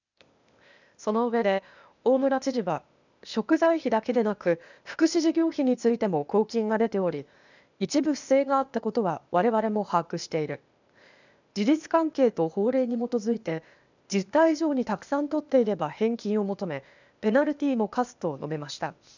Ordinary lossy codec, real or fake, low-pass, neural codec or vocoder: none; fake; 7.2 kHz; codec, 16 kHz, 0.8 kbps, ZipCodec